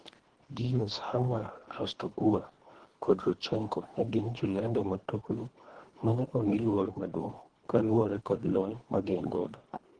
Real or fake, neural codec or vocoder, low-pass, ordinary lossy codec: fake; codec, 24 kHz, 1.5 kbps, HILCodec; 9.9 kHz; Opus, 16 kbps